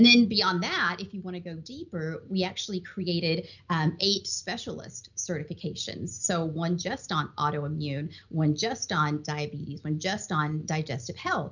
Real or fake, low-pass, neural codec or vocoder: real; 7.2 kHz; none